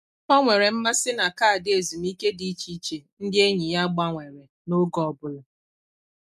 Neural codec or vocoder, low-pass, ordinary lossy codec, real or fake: none; 14.4 kHz; none; real